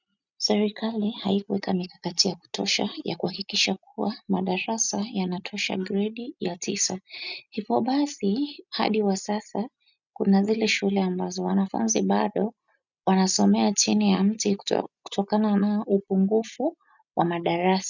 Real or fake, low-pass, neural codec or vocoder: real; 7.2 kHz; none